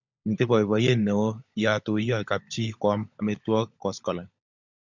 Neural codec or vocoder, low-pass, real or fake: codec, 16 kHz, 16 kbps, FunCodec, trained on LibriTTS, 50 frames a second; 7.2 kHz; fake